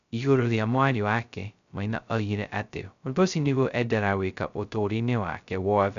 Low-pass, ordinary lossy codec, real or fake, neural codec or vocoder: 7.2 kHz; none; fake; codec, 16 kHz, 0.2 kbps, FocalCodec